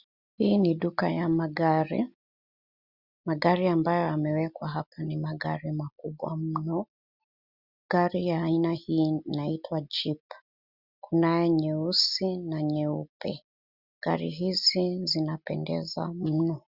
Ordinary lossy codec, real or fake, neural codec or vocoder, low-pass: Opus, 64 kbps; real; none; 5.4 kHz